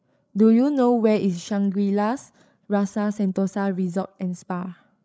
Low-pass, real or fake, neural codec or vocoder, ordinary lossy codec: none; fake; codec, 16 kHz, 16 kbps, FreqCodec, larger model; none